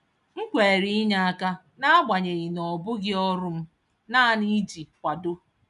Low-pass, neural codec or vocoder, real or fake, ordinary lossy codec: 10.8 kHz; none; real; none